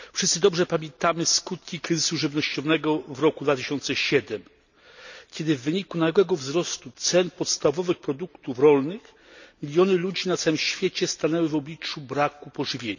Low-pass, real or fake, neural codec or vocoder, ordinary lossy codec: 7.2 kHz; real; none; none